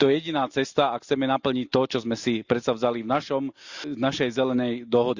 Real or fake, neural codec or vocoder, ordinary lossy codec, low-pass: real; none; Opus, 64 kbps; 7.2 kHz